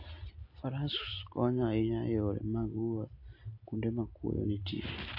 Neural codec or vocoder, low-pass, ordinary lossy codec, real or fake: none; 5.4 kHz; AAC, 32 kbps; real